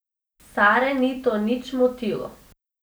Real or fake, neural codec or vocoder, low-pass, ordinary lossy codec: real; none; none; none